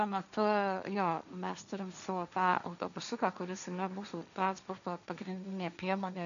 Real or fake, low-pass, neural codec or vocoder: fake; 7.2 kHz; codec, 16 kHz, 1.1 kbps, Voila-Tokenizer